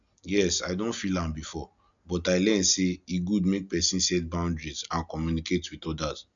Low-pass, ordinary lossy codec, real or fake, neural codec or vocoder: 7.2 kHz; none; real; none